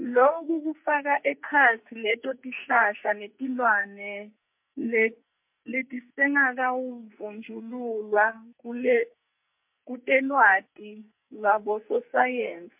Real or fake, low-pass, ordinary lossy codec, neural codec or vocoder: fake; 3.6 kHz; MP3, 32 kbps; codec, 44.1 kHz, 2.6 kbps, SNAC